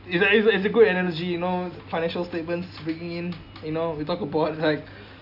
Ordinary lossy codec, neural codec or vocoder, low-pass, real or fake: none; none; 5.4 kHz; real